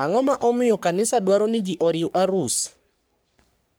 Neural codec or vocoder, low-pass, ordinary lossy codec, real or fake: codec, 44.1 kHz, 3.4 kbps, Pupu-Codec; none; none; fake